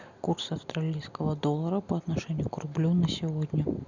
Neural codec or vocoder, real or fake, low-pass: none; real; 7.2 kHz